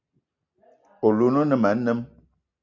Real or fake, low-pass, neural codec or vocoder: real; 7.2 kHz; none